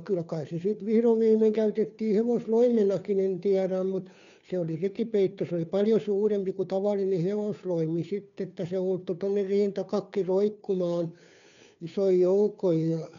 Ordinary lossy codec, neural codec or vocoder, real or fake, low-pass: none; codec, 16 kHz, 2 kbps, FunCodec, trained on Chinese and English, 25 frames a second; fake; 7.2 kHz